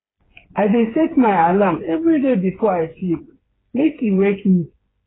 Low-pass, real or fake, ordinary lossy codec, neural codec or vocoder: 7.2 kHz; fake; AAC, 16 kbps; codec, 16 kHz, 4 kbps, FreqCodec, smaller model